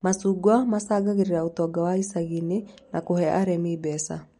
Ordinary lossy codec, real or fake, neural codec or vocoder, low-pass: MP3, 48 kbps; real; none; 19.8 kHz